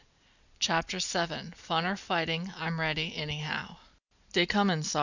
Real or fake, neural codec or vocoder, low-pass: real; none; 7.2 kHz